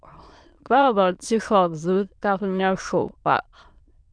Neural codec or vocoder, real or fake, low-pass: autoencoder, 22.05 kHz, a latent of 192 numbers a frame, VITS, trained on many speakers; fake; 9.9 kHz